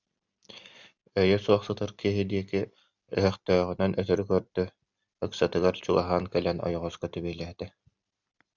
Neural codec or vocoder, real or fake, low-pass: none; real; 7.2 kHz